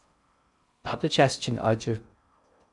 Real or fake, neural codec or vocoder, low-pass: fake; codec, 16 kHz in and 24 kHz out, 0.6 kbps, FocalCodec, streaming, 4096 codes; 10.8 kHz